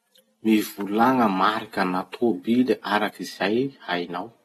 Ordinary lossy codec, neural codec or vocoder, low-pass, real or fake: AAC, 32 kbps; none; 19.8 kHz; real